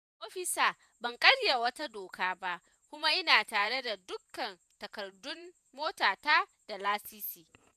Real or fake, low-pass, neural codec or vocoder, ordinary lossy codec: fake; 14.4 kHz; vocoder, 48 kHz, 128 mel bands, Vocos; none